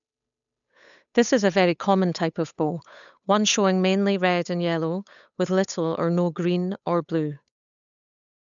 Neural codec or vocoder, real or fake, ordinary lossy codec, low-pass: codec, 16 kHz, 8 kbps, FunCodec, trained on Chinese and English, 25 frames a second; fake; none; 7.2 kHz